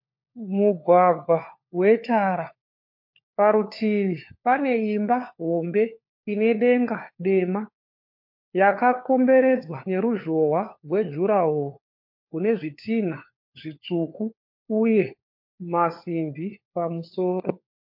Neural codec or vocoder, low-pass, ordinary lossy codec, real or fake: codec, 16 kHz, 4 kbps, FunCodec, trained on LibriTTS, 50 frames a second; 5.4 kHz; MP3, 32 kbps; fake